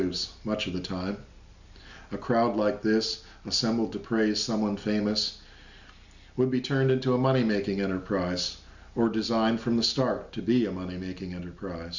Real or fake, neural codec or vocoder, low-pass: real; none; 7.2 kHz